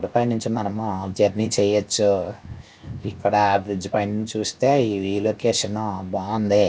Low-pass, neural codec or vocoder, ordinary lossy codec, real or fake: none; codec, 16 kHz, 0.7 kbps, FocalCodec; none; fake